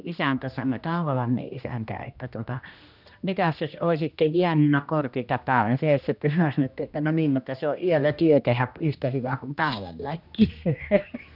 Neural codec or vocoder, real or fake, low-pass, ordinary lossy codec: codec, 16 kHz, 1 kbps, X-Codec, HuBERT features, trained on general audio; fake; 5.4 kHz; none